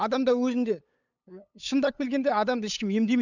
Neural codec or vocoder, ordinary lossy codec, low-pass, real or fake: codec, 16 kHz, 8 kbps, FunCodec, trained on LibriTTS, 25 frames a second; none; 7.2 kHz; fake